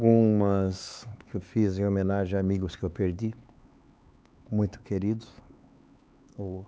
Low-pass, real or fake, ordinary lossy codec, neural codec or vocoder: none; fake; none; codec, 16 kHz, 4 kbps, X-Codec, HuBERT features, trained on LibriSpeech